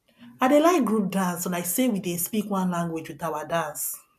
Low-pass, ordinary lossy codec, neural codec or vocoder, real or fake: 14.4 kHz; none; none; real